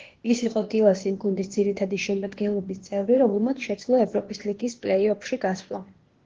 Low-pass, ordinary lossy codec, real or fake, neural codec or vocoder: 7.2 kHz; Opus, 16 kbps; fake; codec, 16 kHz, 0.8 kbps, ZipCodec